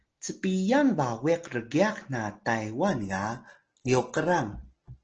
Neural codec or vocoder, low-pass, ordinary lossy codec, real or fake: none; 7.2 kHz; Opus, 16 kbps; real